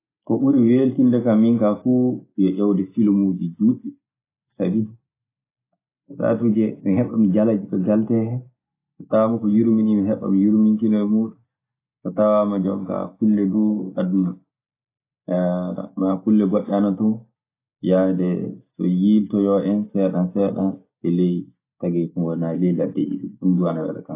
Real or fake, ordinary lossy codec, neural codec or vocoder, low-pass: real; AAC, 24 kbps; none; 3.6 kHz